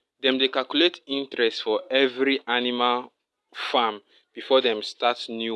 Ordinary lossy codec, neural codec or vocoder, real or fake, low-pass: none; none; real; none